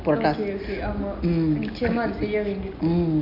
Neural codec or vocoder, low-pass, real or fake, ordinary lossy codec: none; 5.4 kHz; real; none